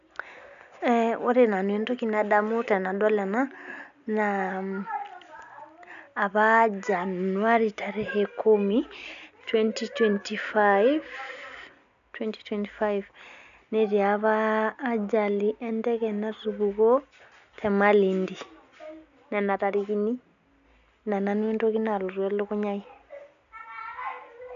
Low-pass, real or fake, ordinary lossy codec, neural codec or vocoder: 7.2 kHz; real; none; none